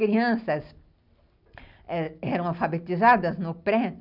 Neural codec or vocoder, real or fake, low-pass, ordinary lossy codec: none; real; 5.4 kHz; none